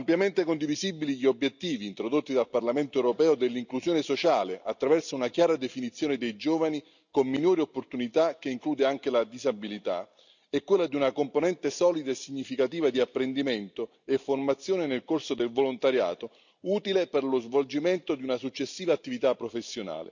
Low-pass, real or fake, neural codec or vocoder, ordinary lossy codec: 7.2 kHz; real; none; none